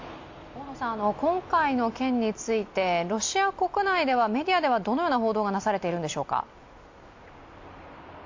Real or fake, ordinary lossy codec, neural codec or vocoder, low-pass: real; AAC, 48 kbps; none; 7.2 kHz